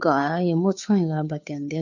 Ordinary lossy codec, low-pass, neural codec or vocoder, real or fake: none; 7.2 kHz; codec, 16 kHz, 2 kbps, FunCodec, trained on Chinese and English, 25 frames a second; fake